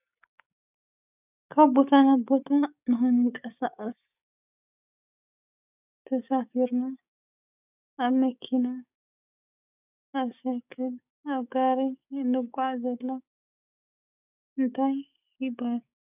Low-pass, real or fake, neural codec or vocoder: 3.6 kHz; fake; codec, 16 kHz, 6 kbps, DAC